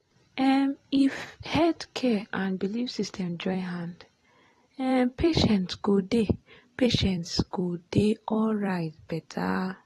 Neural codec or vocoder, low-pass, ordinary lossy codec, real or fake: vocoder, 44.1 kHz, 128 mel bands every 256 samples, BigVGAN v2; 19.8 kHz; AAC, 32 kbps; fake